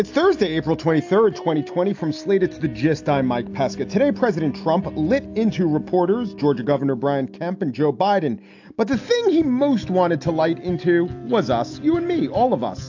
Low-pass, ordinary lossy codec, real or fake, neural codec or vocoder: 7.2 kHz; AAC, 48 kbps; real; none